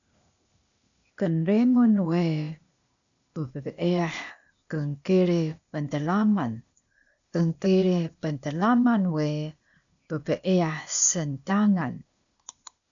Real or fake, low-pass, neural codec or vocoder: fake; 7.2 kHz; codec, 16 kHz, 0.8 kbps, ZipCodec